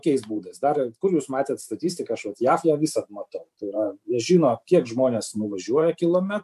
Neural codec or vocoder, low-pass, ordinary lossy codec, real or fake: vocoder, 44.1 kHz, 128 mel bands every 512 samples, BigVGAN v2; 14.4 kHz; MP3, 96 kbps; fake